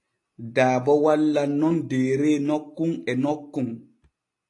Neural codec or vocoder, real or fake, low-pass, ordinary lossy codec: none; real; 10.8 kHz; AAC, 48 kbps